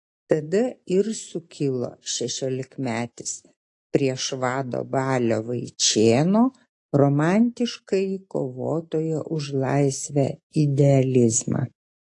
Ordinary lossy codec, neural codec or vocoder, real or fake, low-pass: AAC, 48 kbps; none; real; 10.8 kHz